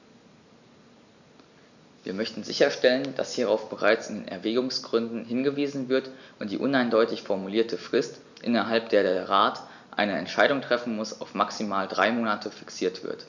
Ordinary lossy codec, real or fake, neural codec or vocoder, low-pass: none; real; none; 7.2 kHz